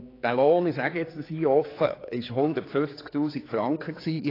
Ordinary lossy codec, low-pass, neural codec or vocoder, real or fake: AAC, 24 kbps; 5.4 kHz; codec, 16 kHz, 4 kbps, X-Codec, HuBERT features, trained on general audio; fake